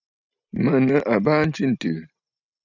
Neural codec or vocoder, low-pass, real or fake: none; 7.2 kHz; real